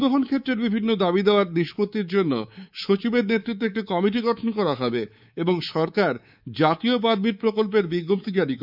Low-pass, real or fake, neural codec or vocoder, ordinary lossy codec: 5.4 kHz; fake; codec, 24 kHz, 3.1 kbps, DualCodec; none